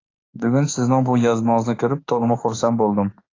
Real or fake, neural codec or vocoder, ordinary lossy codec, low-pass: fake; autoencoder, 48 kHz, 32 numbers a frame, DAC-VAE, trained on Japanese speech; AAC, 32 kbps; 7.2 kHz